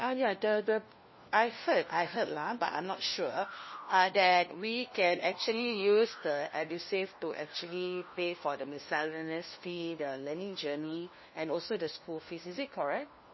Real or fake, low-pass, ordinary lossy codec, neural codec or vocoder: fake; 7.2 kHz; MP3, 24 kbps; codec, 16 kHz, 1 kbps, FunCodec, trained on LibriTTS, 50 frames a second